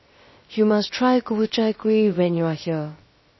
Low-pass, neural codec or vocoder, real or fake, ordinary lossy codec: 7.2 kHz; codec, 16 kHz, 0.3 kbps, FocalCodec; fake; MP3, 24 kbps